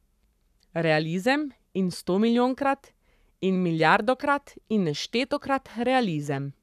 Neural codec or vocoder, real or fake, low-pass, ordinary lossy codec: codec, 44.1 kHz, 7.8 kbps, Pupu-Codec; fake; 14.4 kHz; none